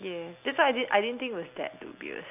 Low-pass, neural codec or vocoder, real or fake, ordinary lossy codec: 3.6 kHz; none; real; none